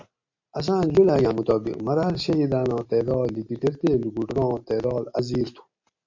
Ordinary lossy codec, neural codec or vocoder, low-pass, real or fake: MP3, 48 kbps; none; 7.2 kHz; real